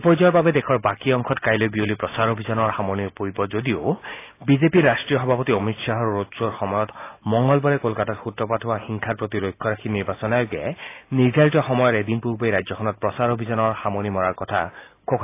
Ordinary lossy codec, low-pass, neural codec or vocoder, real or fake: AAC, 24 kbps; 3.6 kHz; none; real